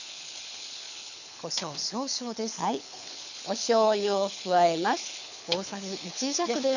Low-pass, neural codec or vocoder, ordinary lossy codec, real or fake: 7.2 kHz; codec, 24 kHz, 6 kbps, HILCodec; none; fake